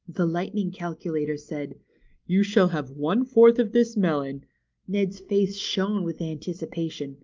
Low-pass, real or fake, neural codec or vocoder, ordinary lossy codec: 7.2 kHz; real; none; Opus, 32 kbps